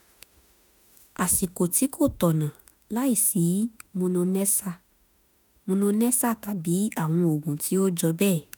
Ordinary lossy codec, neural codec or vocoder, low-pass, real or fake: none; autoencoder, 48 kHz, 32 numbers a frame, DAC-VAE, trained on Japanese speech; none; fake